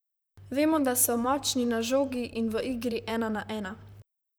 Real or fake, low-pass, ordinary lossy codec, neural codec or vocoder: fake; none; none; vocoder, 44.1 kHz, 128 mel bands, Pupu-Vocoder